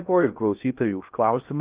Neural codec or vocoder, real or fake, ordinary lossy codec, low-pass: codec, 16 kHz in and 24 kHz out, 0.6 kbps, FocalCodec, streaming, 2048 codes; fake; Opus, 24 kbps; 3.6 kHz